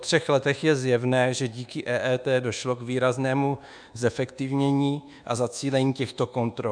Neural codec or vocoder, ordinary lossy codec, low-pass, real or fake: codec, 24 kHz, 1.2 kbps, DualCodec; MP3, 96 kbps; 9.9 kHz; fake